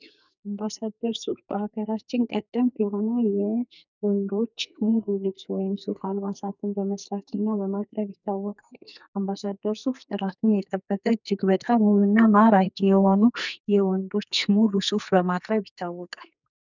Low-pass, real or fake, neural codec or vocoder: 7.2 kHz; fake; codec, 44.1 kHz, 2.6 kbps, SNAC